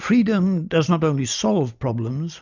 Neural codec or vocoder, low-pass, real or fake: none; 7.2 kHz; real